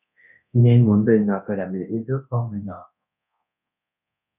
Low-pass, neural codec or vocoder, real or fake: 3.6 kHz; codec, 24 kHz, 0.9 kbps, DualCodec; fake